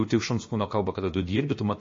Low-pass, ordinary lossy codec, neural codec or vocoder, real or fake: 7.2 kHz; MP3, 32 kbps; codec, 16 kHz, about 1 kbps, DyCAST, with the encoder's durations; fake